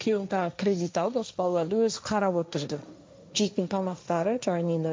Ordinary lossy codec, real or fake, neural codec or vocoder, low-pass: none; fake; codec, 16 kHz, 1.1 kbps, Voila-Tokenizer; none